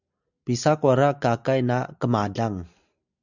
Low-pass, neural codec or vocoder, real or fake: 7.2 kHz; none; real